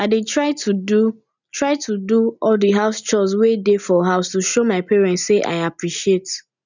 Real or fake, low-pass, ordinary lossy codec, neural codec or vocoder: real; 7.2 kHz; none; none